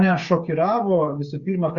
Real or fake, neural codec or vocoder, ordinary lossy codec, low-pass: fake; codec, 16 kHz, 8 kbps, FreqCodec, smaller model; Opus, 64 kbps; 7.2 kHz